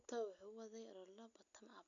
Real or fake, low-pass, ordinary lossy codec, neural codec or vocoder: real; 7.2 kHz; none; none